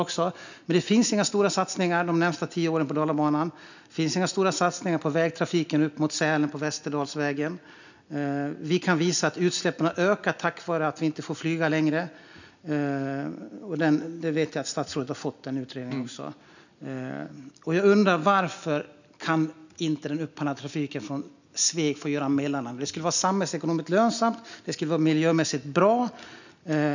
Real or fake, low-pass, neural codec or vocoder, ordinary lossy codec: real; 7.2 kHz; none; none